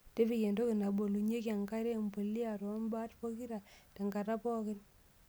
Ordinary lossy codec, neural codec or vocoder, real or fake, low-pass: none; none; real; none